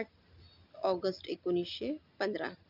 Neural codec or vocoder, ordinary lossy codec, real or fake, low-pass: none; none; real; 5.4 kHz